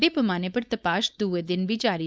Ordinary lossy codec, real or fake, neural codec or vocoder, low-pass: none; fake; codec, 16 kHz, 4.8 kbps, FACodec; none